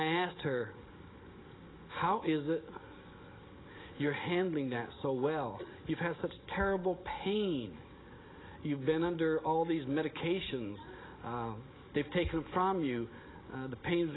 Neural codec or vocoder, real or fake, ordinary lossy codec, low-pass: none; real; AAC, 16 kbps; 7.2 kHz